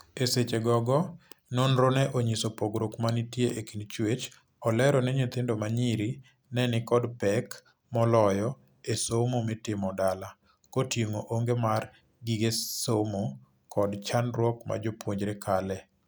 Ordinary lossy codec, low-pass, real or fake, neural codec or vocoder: none; none; real; none